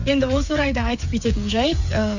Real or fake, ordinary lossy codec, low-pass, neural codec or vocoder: fake; none; 7.2 kHz; codec, 16 kHz, 8 kbps, FreqCodec, smaller model